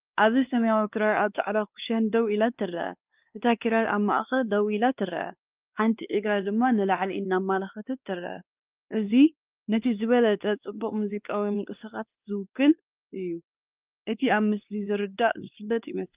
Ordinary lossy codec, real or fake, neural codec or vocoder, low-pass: Opus, 24 kbps; fake; codec, 16 kHz, 2 kbps, X-Codec, WavLM features, trained on Multilingual LibriSpeech; 3.6 kHz